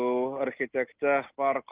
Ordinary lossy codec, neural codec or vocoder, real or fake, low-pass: Opus, 24 kbps; none; real; 3.6 kHz